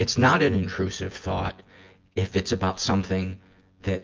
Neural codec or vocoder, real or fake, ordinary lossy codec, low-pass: vocoder, 24 kHz, 100 mel bands, Vocos; fake; Opus, 24 kbps; 7.2 kHz